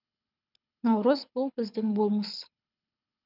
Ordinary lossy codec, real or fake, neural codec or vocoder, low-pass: none; fake; codec, 24 kHz, 6 kbps, HILCodec; 5.4 kHz